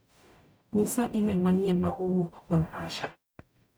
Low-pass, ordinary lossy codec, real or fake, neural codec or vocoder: none; none; fake; codec, 44.1 kHz, 0.9 kbps, DAC